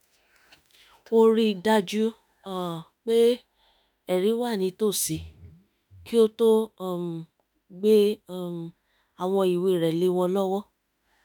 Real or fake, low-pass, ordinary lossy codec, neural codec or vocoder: fake; none; none; autoencoder, 48 kHz, 32 numbers a frame, DAC-VAE, trained on Japanese speech